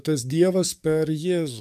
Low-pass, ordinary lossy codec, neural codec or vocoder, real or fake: 14.4 kHz; AAC, 96 kbps; codec, 44.1 kHz, 7.8 kbps, DAC; fake